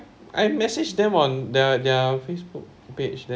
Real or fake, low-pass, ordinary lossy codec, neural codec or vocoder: real; none; none; none